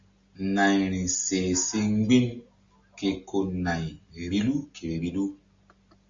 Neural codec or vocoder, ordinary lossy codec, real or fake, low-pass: none; Opus, 64 kbps; real; 7.2 kHz